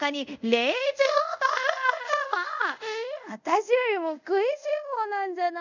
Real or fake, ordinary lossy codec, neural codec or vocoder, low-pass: fake; none; codec, 24 kHz, 0.5 kbps, DualCodec; 7.2 kHz